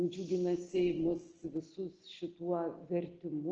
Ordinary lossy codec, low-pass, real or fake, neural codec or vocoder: Opus, 16 kbps; 7.2 kHz; real; none